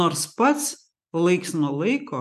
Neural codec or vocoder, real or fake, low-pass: none; real; 14.4 kHz